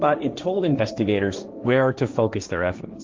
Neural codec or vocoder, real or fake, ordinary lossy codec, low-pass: codec, 16 kHz, 1.1 kbps, Voila-Tokenizer; fake; Opus, 32 kbps; 7.2 kHz